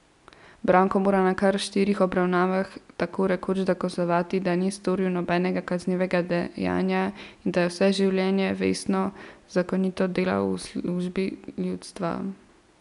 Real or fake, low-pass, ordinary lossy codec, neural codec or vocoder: real; 10.8 kHz; none; none